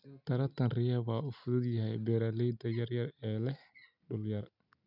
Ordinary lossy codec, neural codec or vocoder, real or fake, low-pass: none; none; real; 5.4 kHz